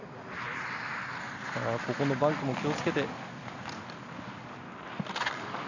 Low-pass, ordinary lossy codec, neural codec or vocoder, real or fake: 7.2 kHz; none; none; real